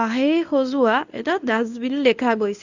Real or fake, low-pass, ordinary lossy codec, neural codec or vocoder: fake; 7.2 kHz; none; codec, 24 kHz, 0.9 kbps, WavTokenizer, medium speech release version 1